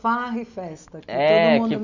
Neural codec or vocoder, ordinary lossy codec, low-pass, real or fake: none; none; 7.2 kHz; real